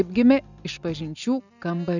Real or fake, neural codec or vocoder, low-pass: real; none; 7.2 kHz